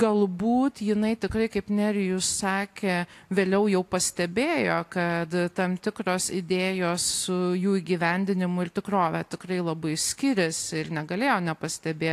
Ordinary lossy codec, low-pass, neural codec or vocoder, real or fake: AAC, 64 kbps; 14.4 kHz; none; real